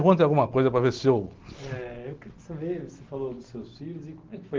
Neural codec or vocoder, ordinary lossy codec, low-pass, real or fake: none; Opus, 16 kbps; 7.2 kHz; real